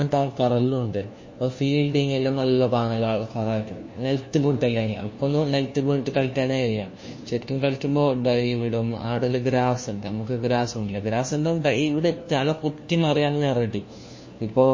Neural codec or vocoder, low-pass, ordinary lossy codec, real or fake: codec, 16 kHz, 1 kbps, FunCodec, trained on LibriTTS, 50 frames a second; 7.2 kHz; MP3, 32 kbps; fake